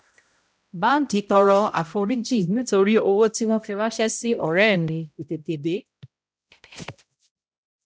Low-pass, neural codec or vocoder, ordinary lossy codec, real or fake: none; codec, 16 kHz, 0.5 kbps, X-Codec, HuBERT features, trained on balanced general audio; none; fake